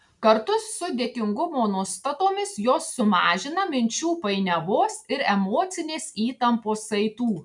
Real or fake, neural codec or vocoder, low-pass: real; none; 10.8 kHz